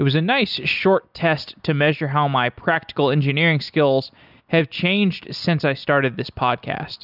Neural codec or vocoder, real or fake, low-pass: none; real; 5.4 kHz